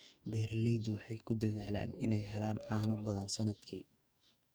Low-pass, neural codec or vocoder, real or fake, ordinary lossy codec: none; codec, 44.1 kHz, 2.6 kbps, DAC; fake; none